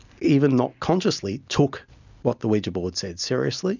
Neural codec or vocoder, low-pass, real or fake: none; 7.2 kHz; real